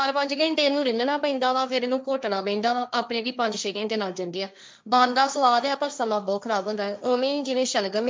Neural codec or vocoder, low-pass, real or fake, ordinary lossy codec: codec, 16 kHz, 1.1 kbps, Voila-Tokenizer; none; fake; none